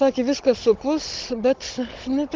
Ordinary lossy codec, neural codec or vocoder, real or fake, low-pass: Opus, 16 kbps; codec, 16 kHz, 16 kbps, FunCodec, trained on Chinese and English, 50 frames a second; fake; 7.2 kHz